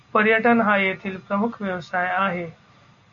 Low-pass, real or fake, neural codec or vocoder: 7.2 kHz; real; none